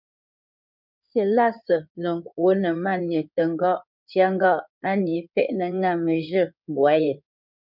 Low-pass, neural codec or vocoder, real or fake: 5.4 kHz; codec, 16 kHz in and 24 kHz out, 2.2 kbps, FireRedTTS-2 codec; fake